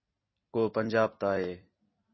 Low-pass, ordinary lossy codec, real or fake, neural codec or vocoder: 7.2 kHz; MP3, 24 kbps; fake; vocoder, 44.1 kHz, 128 mel bands every 256 samples, BigVGAN v2